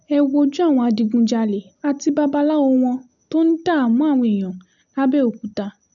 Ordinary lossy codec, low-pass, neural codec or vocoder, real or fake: none; 7.2 kHz; none; real